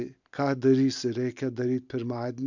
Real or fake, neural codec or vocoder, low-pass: real; none; 7.2 kHz